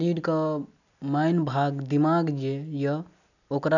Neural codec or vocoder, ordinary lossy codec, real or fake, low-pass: none; none; real; 7.2 kHz